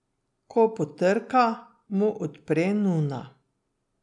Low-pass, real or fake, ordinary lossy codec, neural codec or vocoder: 10.8 kHz; real; none; none